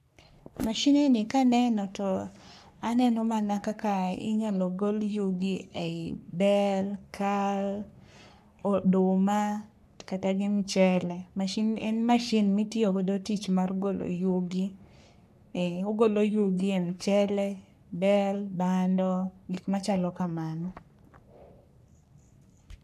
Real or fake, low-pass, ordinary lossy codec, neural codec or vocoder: fake; 14.4 kHz; none; codec, 44.1 kHz, 3.4 kbps, Pupu-Codec